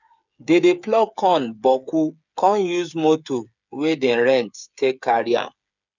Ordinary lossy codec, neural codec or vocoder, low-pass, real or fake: none; codec, 16 kHz, 8 kbps, FreqCodec, smaller model; 7.2 kHz; fake